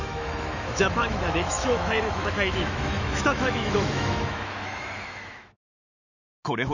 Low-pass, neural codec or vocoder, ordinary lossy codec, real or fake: 7.2 kHz; autoencoder, 48 kHz, 128 numbers a frame, DAC-VAE, trained on Japanese speech; none; fake